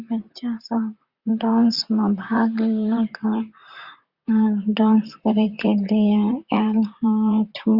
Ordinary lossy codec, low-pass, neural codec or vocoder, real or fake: Opus, 64 kbps; 5.4 kHz; vocoder, 44.1 kHz, 128 mel bands, Pupu-Vocoder; fake